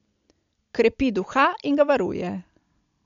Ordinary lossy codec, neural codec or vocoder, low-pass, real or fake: MP3, 64 kbps; none; 7.2 kHz; real